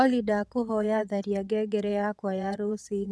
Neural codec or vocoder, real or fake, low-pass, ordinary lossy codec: vocoder, 22.05 kHz, 80 mel bands, WaveNeXt; fake; none; none